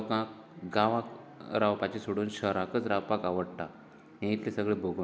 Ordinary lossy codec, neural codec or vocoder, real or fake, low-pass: none; none; real; none